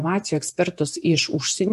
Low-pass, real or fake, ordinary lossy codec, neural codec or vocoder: 14.4 kHz; fake; MP3, 64 kbps; vocoder, 48 kHz, 128 mel bands, Vocos